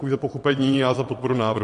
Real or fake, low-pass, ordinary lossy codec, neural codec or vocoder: fake; 9.9 kHz; MP3, 48 kbps; vocoder, 22.05 kHz, 80 mel bands, WaveNeXt